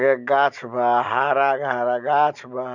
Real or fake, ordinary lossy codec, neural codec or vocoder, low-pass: real; none; none; 7.2 kHz